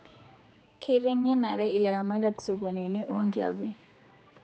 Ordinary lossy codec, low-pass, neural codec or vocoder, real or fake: none; none; codec, 16 kHz, 2 kbps, X-Codec, HuBERT features, trained on general audio; fake